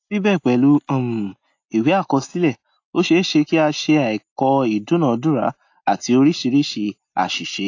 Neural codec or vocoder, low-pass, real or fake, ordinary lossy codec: none; 7.2 kHz; real; AAC, 48 kbps